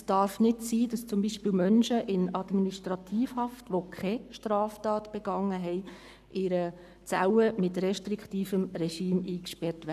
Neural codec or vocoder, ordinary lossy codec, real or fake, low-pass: codec, 44.1 kHz, 7.8 kbps, Pupu-Codec; none; fake; 14.4 kHz